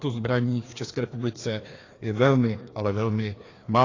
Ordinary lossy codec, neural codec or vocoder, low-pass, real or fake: AAC, 32 kbps; codec, 16 kHz, 2 kbps, FreqCodec, larger model; 7.2 kHz; fake